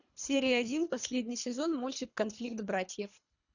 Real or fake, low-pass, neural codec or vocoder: fake; 7.2 kHz; codec, 24 kHz, 3 kbps, HILCodec